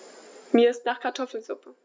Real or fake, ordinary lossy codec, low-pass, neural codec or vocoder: real; none; none; none